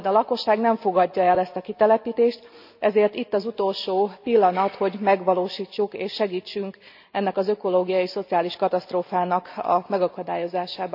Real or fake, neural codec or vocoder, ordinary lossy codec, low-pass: real; none; none; 5.4 kHz